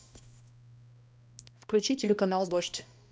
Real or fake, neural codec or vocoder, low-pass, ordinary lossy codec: fake; codec, 16 kHz, 1 kbps, X-Codec, HuBERT features, trained on balanced general audio; none; none